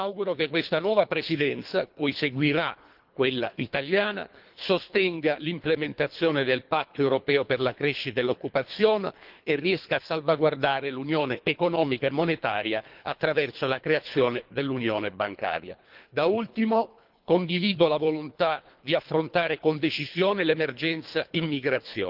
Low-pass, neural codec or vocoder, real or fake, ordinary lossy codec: 5.4 kHz; codec, 24 kHz, 3 kbps, HILCodec; fake; Opus, 24 kbps